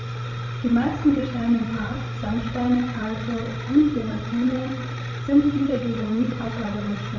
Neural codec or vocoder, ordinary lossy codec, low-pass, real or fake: codec, 16 kHz, 16 kbps, FreqCodec, larger model; MP3, 64 kbps; 7.2 kHz; fake